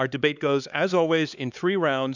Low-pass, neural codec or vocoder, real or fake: 7.2 kHz; codec, 16 kHz, 4 kbps, X-Codec, HuBERT features, trained on LibriSpeech; fake